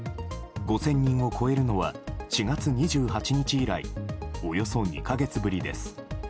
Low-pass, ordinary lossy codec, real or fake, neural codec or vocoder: none; none; real; none